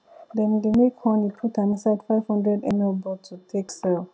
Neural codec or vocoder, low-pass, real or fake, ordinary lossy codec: none; none; real; none